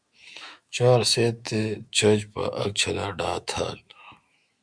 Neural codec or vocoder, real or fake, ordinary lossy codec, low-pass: autoencoder, 48 kHz, 128 numbers a frame, DAC-VAE, trained on Japanese speech; fake; Opus, 64 kbps; 9.9 kHz